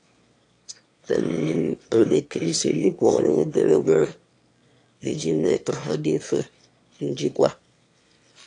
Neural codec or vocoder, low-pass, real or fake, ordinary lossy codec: autoencoder, 22.05 kHz, a latent of 192 numbers a frame, VITS, trained on one speaker; 9.9 kHz; fake; AAC, 48 kbps